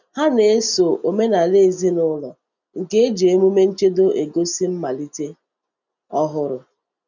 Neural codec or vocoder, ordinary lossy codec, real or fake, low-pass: none; none; real; 7.2 kHz